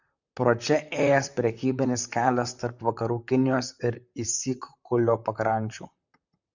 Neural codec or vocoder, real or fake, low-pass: vocoder, 44.1 kHz, 128 mel bands, Pupu-Vocoder; fake; 7.2 kHz